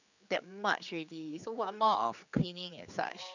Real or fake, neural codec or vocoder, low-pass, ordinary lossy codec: fake; codec, 16 kHz, 4 kbps, X-Codec, HuBERT features, trained on general audio; 7.2 kHz; none